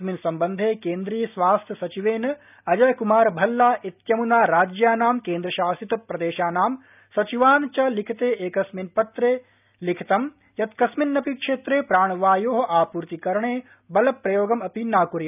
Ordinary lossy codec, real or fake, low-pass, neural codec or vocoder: none; real; 3.6 kHz; none